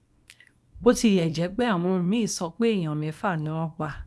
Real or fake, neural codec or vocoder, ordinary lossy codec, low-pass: fake; codec, 24 kHz, 0.9 kbps, WavTokenizer, small release; none; none